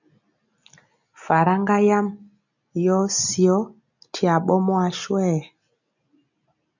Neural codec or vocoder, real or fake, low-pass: none; real; 7.2 kHz